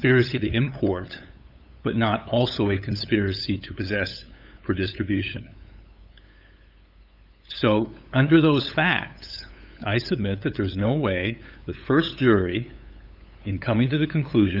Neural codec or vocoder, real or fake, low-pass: codec, 16 kHz, 8 kbps, FunCodec, trained on LibriTTS, 25 frames a second; fake; 5.4 kHz